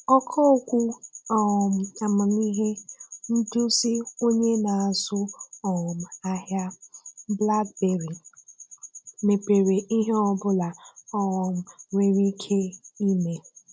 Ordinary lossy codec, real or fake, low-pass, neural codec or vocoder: none; real; none; none